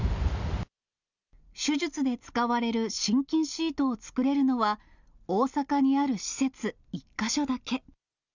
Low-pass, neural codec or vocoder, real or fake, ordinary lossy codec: 7.2 kHz; none; real; none